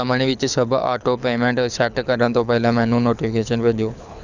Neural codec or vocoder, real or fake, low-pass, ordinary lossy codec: codec, 24 kHz, 6 kbps, HILCodec; fake; 7.2 kHz; none